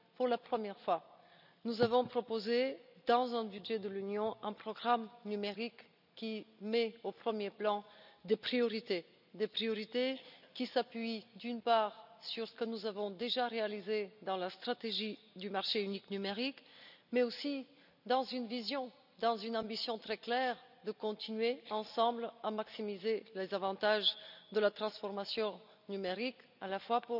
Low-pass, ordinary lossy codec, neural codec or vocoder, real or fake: 5.4 kHz; none; none; real